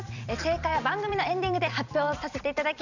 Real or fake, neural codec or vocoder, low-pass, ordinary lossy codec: fake; vocoder, 44.1 kHz, 128 mel bands every 256 samples, BigVGAN v2; 7.2 kHz; none